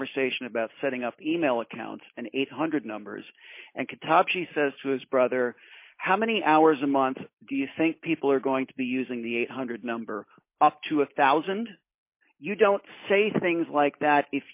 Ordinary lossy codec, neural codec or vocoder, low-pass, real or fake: MP3, 24 kbps; none; 3.6 kHz; real